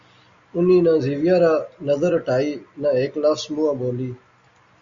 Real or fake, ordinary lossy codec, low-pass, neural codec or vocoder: real; Opus, 64 kbps; 7.2 kHz; none